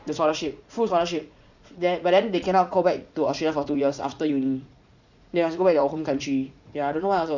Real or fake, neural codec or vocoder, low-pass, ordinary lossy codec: fake; vocoder, 44.1 kHz, 80 mel bands, Vocos; 7.2 kHz; none